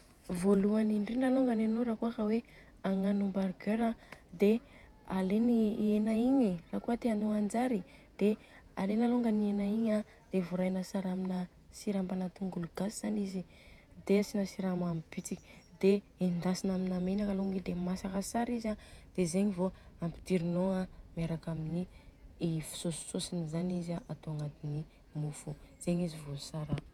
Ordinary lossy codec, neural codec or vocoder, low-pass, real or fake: none; vocoder, 48 kHz, 128 mel bands, Vocos; 19.8 kHz; fake